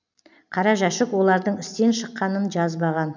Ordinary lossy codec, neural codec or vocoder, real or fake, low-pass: none; none; real; 7.2 kHz